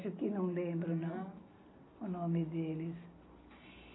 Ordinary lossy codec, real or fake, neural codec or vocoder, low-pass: AAC, 16 kbps; real; none; 7.2 kHz